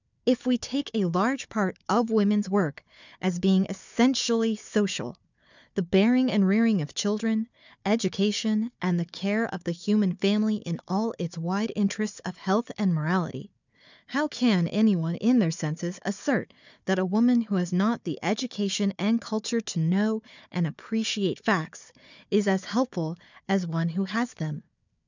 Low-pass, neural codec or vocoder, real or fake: 7.2 kHz; codec, 16 kHz, 4 kbps, FunCodec, trained on Chinese and English, 50 frames a second; fake